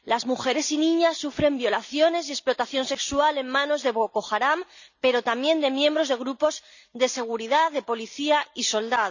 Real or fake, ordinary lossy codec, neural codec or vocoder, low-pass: real; none; none; 7.2 kHz